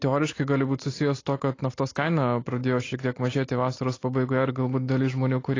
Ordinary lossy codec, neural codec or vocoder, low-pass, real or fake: AAC, 32 kbps; none; 7.2 kHz; real